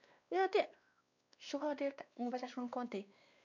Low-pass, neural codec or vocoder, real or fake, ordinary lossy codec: 7.2 kHz; codec, 16 kHz, 2 kbps, X-Codec, WavLM features, trained on Multilingual LibriSpeech; fake; none